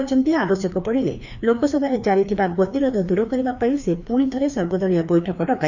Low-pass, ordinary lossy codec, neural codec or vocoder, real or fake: 7.2 kHz; none; codec, 16 kHz, 2 kbps, FreqCodec, larger model; fake